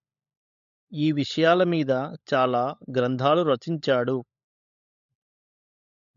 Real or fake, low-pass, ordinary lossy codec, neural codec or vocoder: fake; 7.2 kHz; MP3, 64 kbps; codec, 16 kHz, 16 kbps, FunCodec, trained on LibriTTS, 50 frames a second